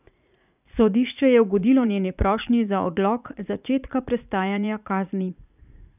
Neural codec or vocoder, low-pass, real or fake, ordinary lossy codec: vocoder, 44.1 kHz, 80 mel bands, Vocos; 3.6 kHz; fake; none